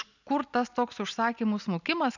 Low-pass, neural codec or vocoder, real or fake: 7.2 kHz; none; real